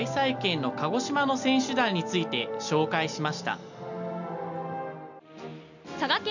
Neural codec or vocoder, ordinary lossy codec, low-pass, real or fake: none; none; 7.2 kHz; real